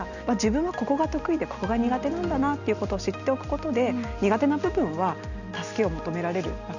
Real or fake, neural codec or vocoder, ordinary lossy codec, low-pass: real; none; none; 7.2 kHz